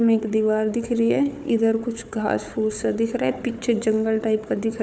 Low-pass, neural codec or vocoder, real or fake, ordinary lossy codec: none; codec, 16 kHz, 4 kbps, FunCodec, trained on Chinese and English, 50 frames a second; fake; none